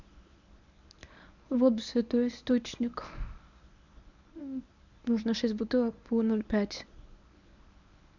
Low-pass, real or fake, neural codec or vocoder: 7.2 kHz; fake; codec, 24 kHz, 0.9 kbps, WavTokenizer, medium speech release version 1